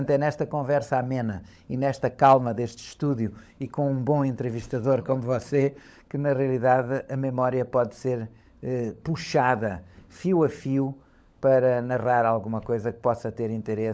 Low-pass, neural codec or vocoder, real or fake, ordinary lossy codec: none; codec, 16 kHz, 16 kbps, FunCodec, trained on LibriTTS, 50 frames a second; fake; none